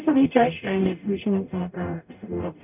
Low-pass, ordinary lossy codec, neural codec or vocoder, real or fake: 3.6 kHz; none; codec, 44.1 kHz, 0.9 kbps, DAC; fake